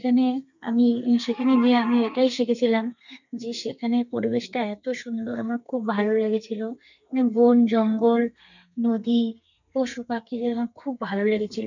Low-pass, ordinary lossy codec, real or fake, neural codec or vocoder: 7.2 kHz; none; fake; codec, 32 kHz, 1.9 kbps, SNAC